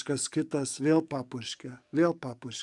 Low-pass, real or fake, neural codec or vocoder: 10.8 kHz; fake; codec, 44.1 kHz, 7.8 kbps, DAC